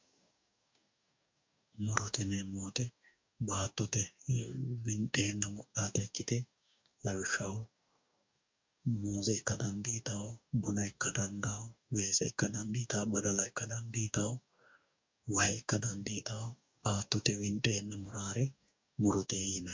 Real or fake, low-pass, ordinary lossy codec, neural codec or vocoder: fake; 7.2 kHz; MP3, 64 kbps; codec, 44.1 kHz, 2.6 kbps, DAC